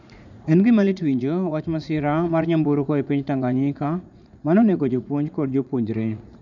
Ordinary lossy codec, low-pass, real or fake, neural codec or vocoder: none; 7.2 kHz; fake; vocoder, 44.1 kHz, 80 mel bands, Vocos